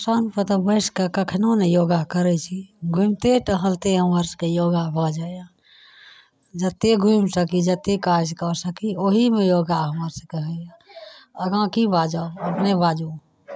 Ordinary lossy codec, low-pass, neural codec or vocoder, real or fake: none; none; none; real